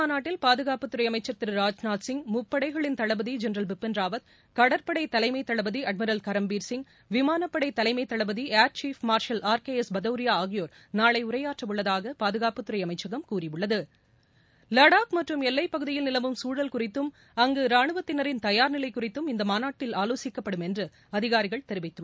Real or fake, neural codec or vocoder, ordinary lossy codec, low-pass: real; none; none; none